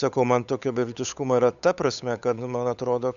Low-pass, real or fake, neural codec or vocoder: 7.2 kHz; fake; codec, 16 kHz, 8 kbps, FunCodec, trained on Chinese and English, 25 frames a second